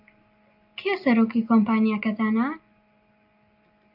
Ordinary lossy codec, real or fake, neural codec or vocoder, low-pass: AAC, 48 kbps; real; none; 5.4 kHz